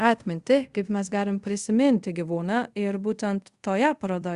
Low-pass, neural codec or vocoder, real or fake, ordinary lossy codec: 10.8 kHz; codec, 24 kHz, 0.5 kbps, DualCodec; fake; Opus, 64 kbps